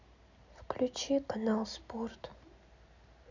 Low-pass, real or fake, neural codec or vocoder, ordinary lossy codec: 7.2 kHz; real; none; none